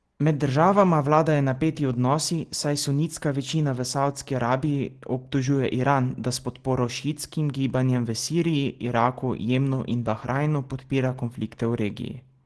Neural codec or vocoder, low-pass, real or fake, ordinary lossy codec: none; 10.8 kHz; real; Opus, 16 kbps